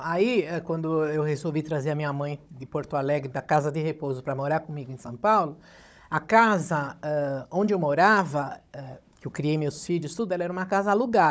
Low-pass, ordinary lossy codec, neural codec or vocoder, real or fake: none; none; codec, 16 kHz, 16 kbps, FunCodec, trained on Chinese and English, 50 frames a second; fake